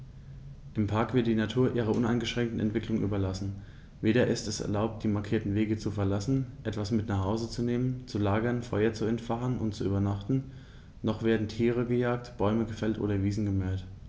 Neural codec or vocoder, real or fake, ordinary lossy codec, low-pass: none; real; none; none